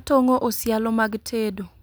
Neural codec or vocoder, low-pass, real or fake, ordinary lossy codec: none; none; real; none